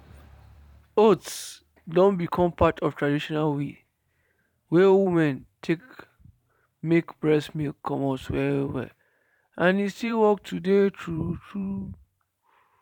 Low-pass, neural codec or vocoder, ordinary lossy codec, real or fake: 19.8 kHz; vocoder, 44.1 kHz, 128 mel bands every 512 samples, BigVGAN v2; none; fake